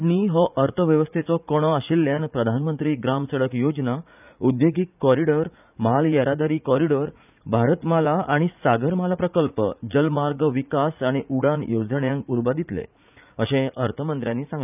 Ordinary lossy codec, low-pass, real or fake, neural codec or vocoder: none; 3.6 kHz; fake; vocoder, 44.1 kHz, 80 mel bands, Vocos